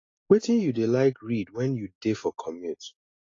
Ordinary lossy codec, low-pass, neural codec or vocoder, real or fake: AAC, 32 kbps; 7.2 kHz; none; real